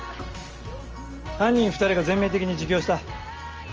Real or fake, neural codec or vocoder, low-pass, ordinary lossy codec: real; none; 7.2 kHz; Opus, 24 kbps